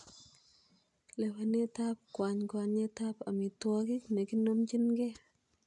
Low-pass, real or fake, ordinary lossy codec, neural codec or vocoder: 10.8 kHz; real; none; none